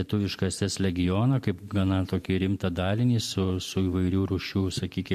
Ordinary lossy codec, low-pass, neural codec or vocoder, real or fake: MP3, 64 kbps; 14.4 kHz; vocoder, 44.1 kHz, 128 mel bands every 512 samples, BigVGAN v2; fake